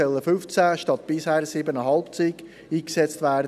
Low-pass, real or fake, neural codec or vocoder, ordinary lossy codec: 14.4 kHz; real; none; none